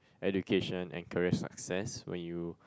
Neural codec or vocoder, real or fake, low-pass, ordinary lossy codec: none; real; none; none